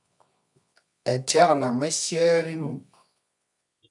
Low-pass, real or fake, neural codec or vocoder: 10.8 kHz; fake; codec, 24 kHz, 0.9 kbps, WavTokenizer, medium music audio release